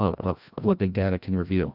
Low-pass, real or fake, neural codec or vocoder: 5.4 kHz; fake; codec, 16 kHz, 1 kbps, FreqCodec, larger model